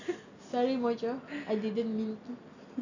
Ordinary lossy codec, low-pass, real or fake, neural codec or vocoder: none; 7.2 kHz; real; none